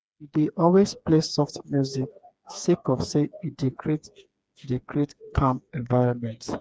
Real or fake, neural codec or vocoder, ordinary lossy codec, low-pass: fake; codec, 16 kHz, 4 kbps, FreqCodec, smaller model; none; none